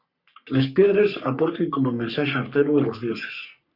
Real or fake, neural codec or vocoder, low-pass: fake; codec, 44.1 kHz, 3.4 kbps, Pupu-Codec; 5.4 kHz